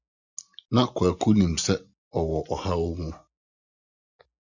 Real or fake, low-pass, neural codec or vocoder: real; 7.2 kHz; none